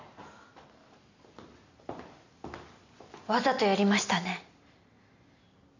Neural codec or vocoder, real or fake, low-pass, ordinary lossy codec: none; real; 7.2 kHz; none